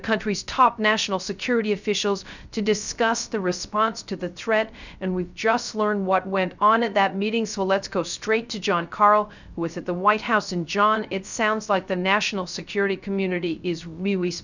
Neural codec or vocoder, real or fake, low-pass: codec, 16 kHz, 0.3 kbps, FocalCodec; fake; 7.2 kHz